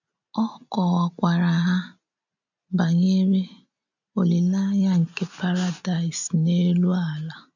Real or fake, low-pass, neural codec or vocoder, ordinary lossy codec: real; 7.2 kHz; none; none